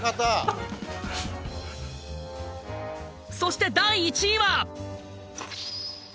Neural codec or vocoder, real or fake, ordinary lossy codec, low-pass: none; real; none; none